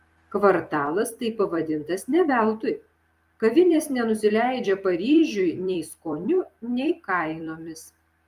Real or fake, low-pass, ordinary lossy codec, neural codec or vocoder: fake; 14.4 kHz; Opus, 32 kbps; vocoder, 44.1 kHz, 128 mel bands every 256 samples, BigVGAN v2